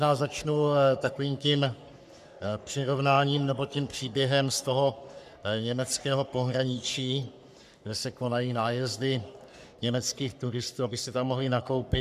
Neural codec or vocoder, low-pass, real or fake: codec, 44.1 kHz, 3.4 kbps, Pupu-Codec; 14.4 kHz; fake